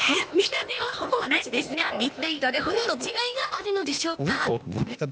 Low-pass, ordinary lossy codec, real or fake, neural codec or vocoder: none; none; fake; codec, 16 kHz, 0.8 kbps, ZipCodec